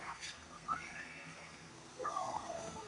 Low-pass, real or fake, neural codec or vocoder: 10.8 kHz; fake; codec, 32 kHz, 1.9 kbps, SNAC